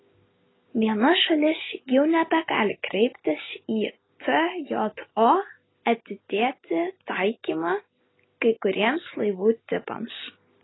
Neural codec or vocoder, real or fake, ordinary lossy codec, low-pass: none; real; AAC, 16 kbps; 7.2 kHz